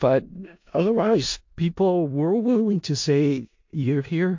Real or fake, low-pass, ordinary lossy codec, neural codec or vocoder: fake; 7.2 kHz; MP3, 48 kbps; codec, 16 kHz in and 24 kHz out, 0.4 kbps, LongCat-Audio-Codec, four codebook decoder